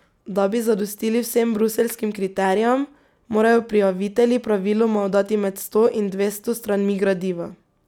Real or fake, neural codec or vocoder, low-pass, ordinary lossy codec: real; none; 19.8 kHz; none